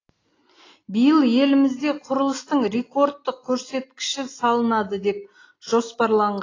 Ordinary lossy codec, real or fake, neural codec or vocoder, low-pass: AAC, 32 kbps; real; none; 7.2 kHz